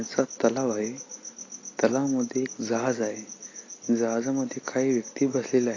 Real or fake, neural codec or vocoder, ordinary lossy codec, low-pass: real; none; AAC, 32 kbps; 7.2 kHz